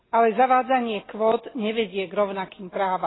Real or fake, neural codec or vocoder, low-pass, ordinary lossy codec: real; none; 7.2 kHz; AAC, 16 kbps